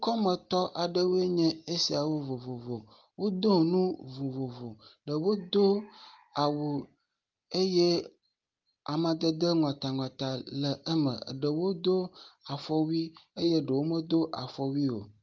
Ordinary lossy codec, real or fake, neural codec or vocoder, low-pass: Opus, 32 kbps; real; none; 7.2 kHz